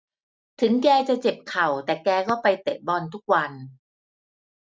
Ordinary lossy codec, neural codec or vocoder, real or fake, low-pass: none; none; real; none